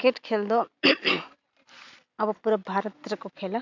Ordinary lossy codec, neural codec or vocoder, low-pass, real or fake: MP3, 64 kbps; none; 7.2 kHz; real